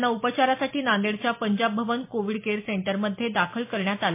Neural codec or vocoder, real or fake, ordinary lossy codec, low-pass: none; real; MP3, 24 kbps; 3.6 kHz